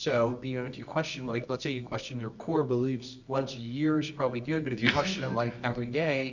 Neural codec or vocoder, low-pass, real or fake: codec, 24 kHz, 0.9 kbps, WavTokenizer, medium music audio release; 7.2 kHz; fake